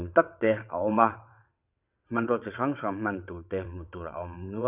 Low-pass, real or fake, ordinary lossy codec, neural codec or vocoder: 3.6 kHz; fake; none; vocoder, 22.05 kHz, 80 mel bands, WaveNeXt